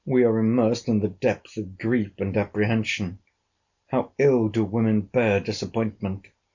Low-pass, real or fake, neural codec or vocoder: 7.2 kHz; real; none